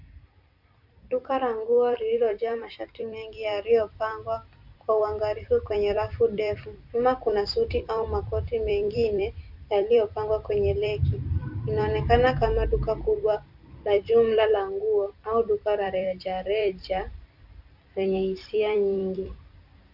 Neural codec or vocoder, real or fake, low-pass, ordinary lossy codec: vocoder, 44.1 kHz, 128 mel bands every 256 samples, BigVGAN v2; fake; 5.4 kHz; Opus, 64 kbps